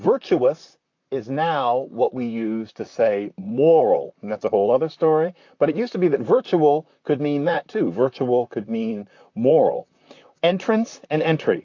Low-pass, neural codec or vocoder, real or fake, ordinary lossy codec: 7.2 kHz; vocoder, 44.1 kHz, 128 mel bands, Pupu-Vocoder; fake; AAC, 48 kbps